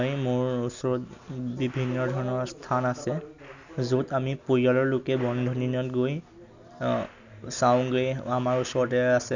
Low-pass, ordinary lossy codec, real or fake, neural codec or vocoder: 7.2 kHz; none; real; none